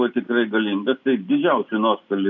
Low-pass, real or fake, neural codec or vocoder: 7.2 kHz; real; none